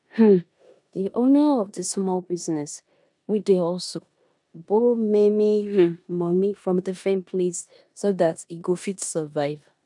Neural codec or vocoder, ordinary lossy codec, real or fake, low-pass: codec, 16 kHz in and 24 kHz out, 0.9 kbps, LongCat-Audio-Codec, four codebook decoder; none; fake; 10.8 kHz